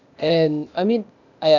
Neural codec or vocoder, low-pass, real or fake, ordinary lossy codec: codec, 16 kHz, 0.8 kbps, ZipCodec; 7.2 kHz; fake; none